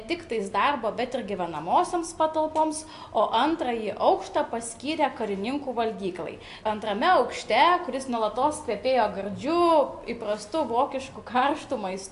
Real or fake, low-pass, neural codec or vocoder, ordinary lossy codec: real; 10.8 kHz; none; AAC, 64 kbps